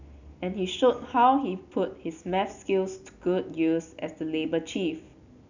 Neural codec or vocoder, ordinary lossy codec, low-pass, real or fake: none; none; 7.2 kHz; real